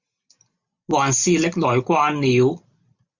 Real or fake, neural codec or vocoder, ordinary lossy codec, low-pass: real; none; Opus, 64 kbps; 7.2 kHz